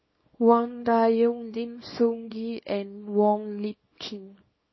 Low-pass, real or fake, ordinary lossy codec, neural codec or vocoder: 7.2 kHz; fake; MP3, 24 kbps; codec, 24 kHz, 0.9 kbps, WavTokenizer, small release